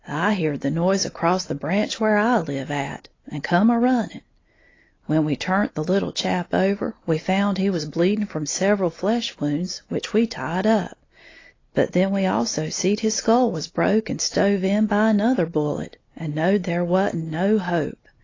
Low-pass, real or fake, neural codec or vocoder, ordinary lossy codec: 7.2 kHz; real; none; AAC, 32 kbps